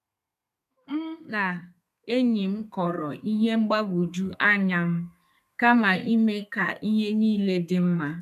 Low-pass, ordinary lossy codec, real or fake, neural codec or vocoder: 14.4 kHz; none; fake; codec, 32 kHz, 1.9 kbps, SNAC